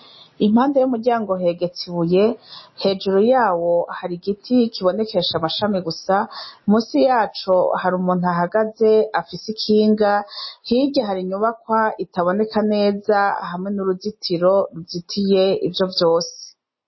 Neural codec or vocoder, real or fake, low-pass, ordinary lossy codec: none; real; 7.2 kHz; MP3, 24 kbps